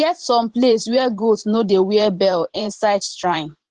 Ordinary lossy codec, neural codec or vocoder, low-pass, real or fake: Opus, 16 kbps; vocoder, 44.1 kHz, 128 mel bands every 512 samples, BigVGAN v2; 10.8 kHz; fake